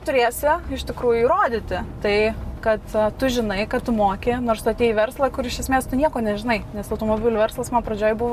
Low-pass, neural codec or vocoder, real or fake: 14.4 kHz; none; real